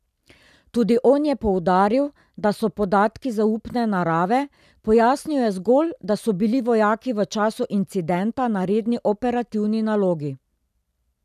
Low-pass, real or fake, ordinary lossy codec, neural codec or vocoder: 14.4 kHz; real; none; none